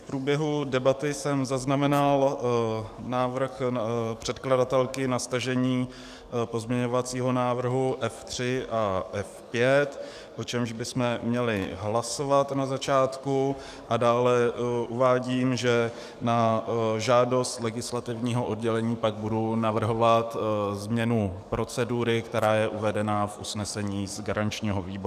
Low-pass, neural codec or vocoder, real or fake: 14.4 kHz; codec, 44.1 kHz, 7.8 kbps, DAC; fake